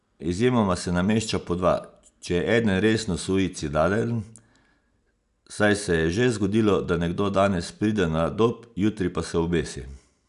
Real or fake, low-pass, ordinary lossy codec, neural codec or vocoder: real; 10.8 kHz; none; none